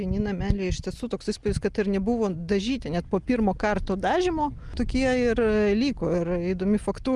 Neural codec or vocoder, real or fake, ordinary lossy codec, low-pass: none; real; Opus, 32 kbps; 10.8 kHz